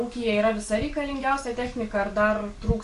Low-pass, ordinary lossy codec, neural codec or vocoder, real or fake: 14.4 kHz; MP3, 48 kbps; codec, 44.1 kHz, 7.8 kbps, DAC; fake